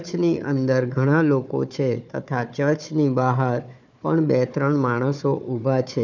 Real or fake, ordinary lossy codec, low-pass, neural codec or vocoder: fake; none; 7.2 kHz; codec, 16 kHz, 4 kbps, FunCodec, trained on Chinese and English, 50 frames a second